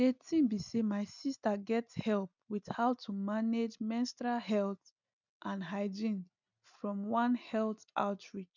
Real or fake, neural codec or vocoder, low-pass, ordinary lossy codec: real; none; 7.2 kHz; none